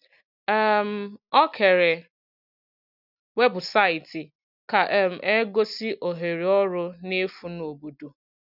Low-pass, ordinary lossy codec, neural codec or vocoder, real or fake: 5.4 kHz; none; none; real